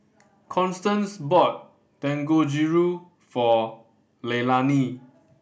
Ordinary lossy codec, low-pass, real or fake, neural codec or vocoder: none; none; real; none